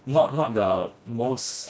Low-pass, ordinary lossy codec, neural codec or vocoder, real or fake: none; none; codec, 16 kHz, 1 kbps, FreqCodec, smaller model; fake